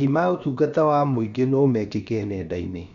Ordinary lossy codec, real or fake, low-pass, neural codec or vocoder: MP3, 64 kbps; fake; 7.2 kHz; codec, 16 kHz, about 1 kbps, DyCAST, with the encoder's durations